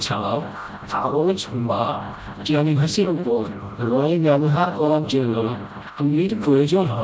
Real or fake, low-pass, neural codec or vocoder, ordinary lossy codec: fake; none; codec, 16 kHz, 0.5 kbps, FreqCodec, smaller model; none